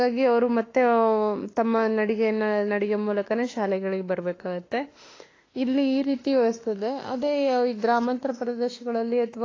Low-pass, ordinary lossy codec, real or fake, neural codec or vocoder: 7.2 kHz; AAC, 32 kbps; fake; autoencoder, 48 kHz, 32 numbers a frame, DAC-VAE, trained on Japanese speech